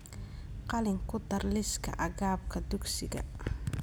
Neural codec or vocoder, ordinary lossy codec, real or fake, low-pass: none; none; real; none